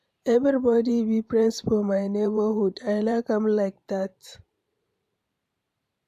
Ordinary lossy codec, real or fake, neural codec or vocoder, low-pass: AAC, 96 kbps; fake; vocoder, 44.1 kHz, 128 mel bands every 512 samples, BigVGAN v2; 14.4 kHz